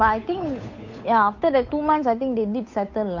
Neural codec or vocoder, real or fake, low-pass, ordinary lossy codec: codec, 16 kHz, 8 kbps, FunCodec, trained on Chinese and English, 25 frames a second; fake; 7.2 kHz; MP3, 48 kbps